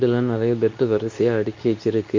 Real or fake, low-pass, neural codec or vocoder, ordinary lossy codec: fake; 7.2 kHz; codec, 24 kHz, 0.9 kbps, WavTokenizer, medium speech release version 2; MP3, 48 kbps